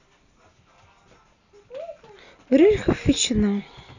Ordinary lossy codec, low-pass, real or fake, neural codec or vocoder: none; 7.2 kHz; real; none